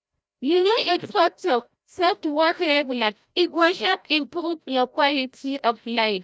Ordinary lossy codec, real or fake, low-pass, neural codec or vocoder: none; fake; none; codec, 16 kHz, 0.5 kbps, FreqCodec, larger model